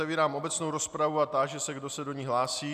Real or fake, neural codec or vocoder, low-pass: real; none; 14.4 kHz